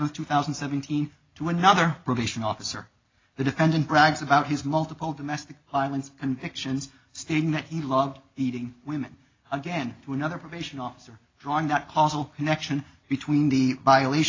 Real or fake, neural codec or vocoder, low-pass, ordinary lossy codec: real; none; 7.2 kHz; AAC, 32 kbps